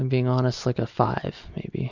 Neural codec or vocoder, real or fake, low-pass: none; real; 7.2 kHz